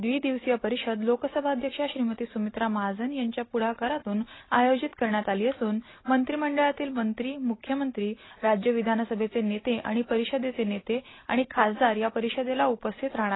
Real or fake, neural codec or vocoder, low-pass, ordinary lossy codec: real; none; 7.2 kHz; AAC, 16 kbps